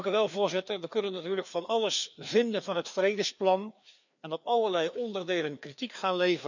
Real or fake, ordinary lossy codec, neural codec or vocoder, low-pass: fake; none; codec, 16 kHz, 2 kbps, FreqCodec, larger model; 7.2 kHz